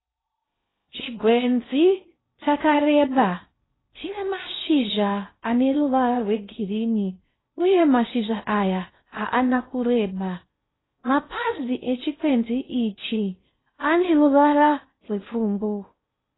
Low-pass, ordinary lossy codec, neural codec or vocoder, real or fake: 7.2 kHz; AAC, 16 kbps; codec, 16 kHz in and 24 kHz out, 0.6 kbps, FocalCodec, streaming, 4096 codes; fake